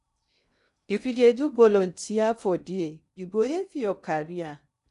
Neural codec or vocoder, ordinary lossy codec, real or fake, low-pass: codec, 16 kHz in and 24 kHz out, 0.6 kbps, FocalCodec, streaming, 2048 codes; none; fake; 10.8 kHz